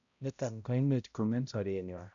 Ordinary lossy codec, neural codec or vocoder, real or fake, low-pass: none; codec, 16 kHz, 0.5 kbps, X-Codec, HuBERT features, trained on balanced general audio; fake; 7.2 kHz